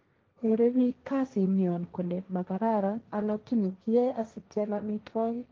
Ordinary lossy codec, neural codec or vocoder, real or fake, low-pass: Opus, 24 kbps; codec, 16 kHz, 1.1 kbps, Voila-Tokenizer; fake; 7.2 kHz